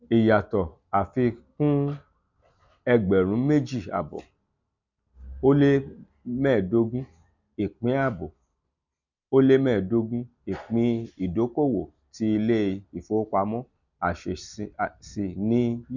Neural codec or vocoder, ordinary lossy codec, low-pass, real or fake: none; none; 7.2 kHz; real